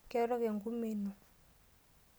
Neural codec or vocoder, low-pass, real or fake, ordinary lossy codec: none; none; real; none